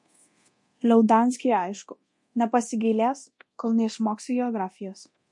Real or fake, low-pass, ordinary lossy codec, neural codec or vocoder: fake; 10.8 kHz; MP3, 48 kbps; codec, 24 kHz, 0.9 kbps, DualCodec